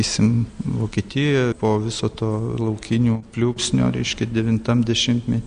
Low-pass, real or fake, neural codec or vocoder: 9.9 kHz; real; none